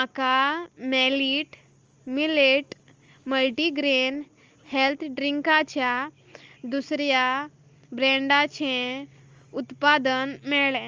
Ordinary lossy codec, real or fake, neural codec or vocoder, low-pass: Opus, 32 kbps; real; none; 7.2 kHz